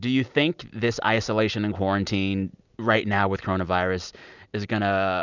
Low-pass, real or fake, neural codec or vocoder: 7.2 kHz; real; none